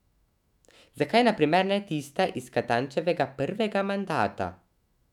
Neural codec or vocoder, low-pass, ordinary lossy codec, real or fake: autoencoder, 48 kHz, 128 numbers a frame, DAC-VAE, trained on Japanese speech; 19.8 kHz; none; fake